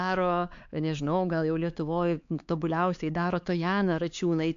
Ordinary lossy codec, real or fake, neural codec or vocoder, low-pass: AAC, 64 kbps; fake; codec, 16 kHz, 4 kbps, X-Codec, HuBERT features, trained on LibriSpeech; 7.2 kHz